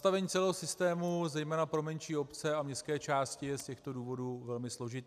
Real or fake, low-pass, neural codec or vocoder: real; 14.4 kHz; none